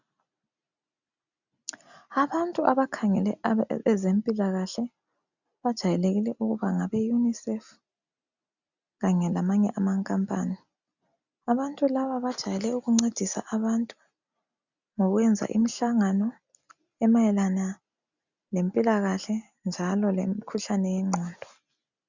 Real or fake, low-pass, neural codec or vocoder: real; 7.2 kHz; none